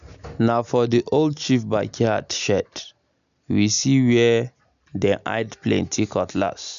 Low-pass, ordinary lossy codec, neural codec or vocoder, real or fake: 7.2 kHz; none; none; real